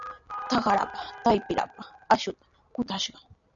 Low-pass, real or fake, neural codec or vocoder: 7.2 kHz; real; none